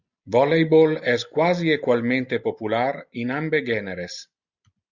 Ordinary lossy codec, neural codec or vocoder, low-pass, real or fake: Opus, 64 kbps; none; 7.2 kHz; real